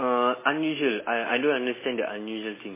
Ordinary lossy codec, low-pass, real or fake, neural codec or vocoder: MP3, 16 kbps; 3.6 kHz; real; none